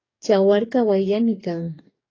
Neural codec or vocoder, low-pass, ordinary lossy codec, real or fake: codec, 44.1 kHz, 2.6 kbps, DAC; 7.2 kHz; AAC, 32 kbps; fake